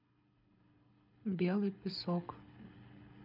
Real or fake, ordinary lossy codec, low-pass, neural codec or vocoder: fake; MP3, 48 kbps; 5.4 kHz; codec, 24 kHz, 6 kbps, HILCodec